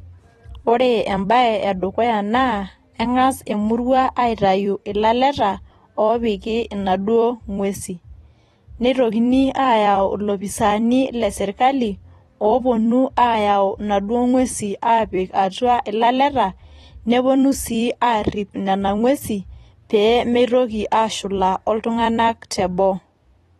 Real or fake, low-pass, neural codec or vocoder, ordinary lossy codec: fake; 19.8 kHz; vocoder, 44.1 kHz, 128 mel bands every 256 samples, BigVGAN v2; AAC, 32 kbps